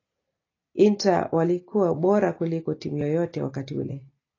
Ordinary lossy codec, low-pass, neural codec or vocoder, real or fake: AAC, 32 kbps; 7.2 kHz; none; real